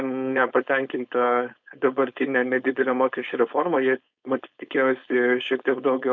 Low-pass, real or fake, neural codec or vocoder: 7.2 kHz; fake; codec, 16 kHz, 4.8 kbps, FACodec